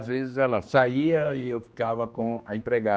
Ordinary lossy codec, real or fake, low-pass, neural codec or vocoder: none; fake; none; codec, 16 kHz, 2 kbps, X-Codec, HuBERT features, trained on general audio